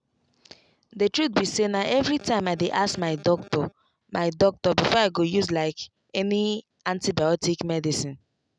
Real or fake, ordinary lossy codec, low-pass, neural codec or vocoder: real; none; 9.9 kHz; none